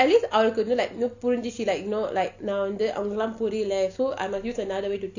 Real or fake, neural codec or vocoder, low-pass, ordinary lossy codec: real; none; 7.2 kHz; none